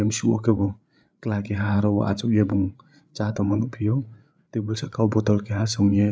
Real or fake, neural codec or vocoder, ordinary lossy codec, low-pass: fake; codec, 16 kHz, 8 kbps, FreqCodec, larger model; none; none